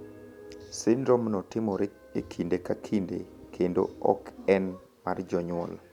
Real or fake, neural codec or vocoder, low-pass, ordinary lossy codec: real; none; 19.8 kHz; none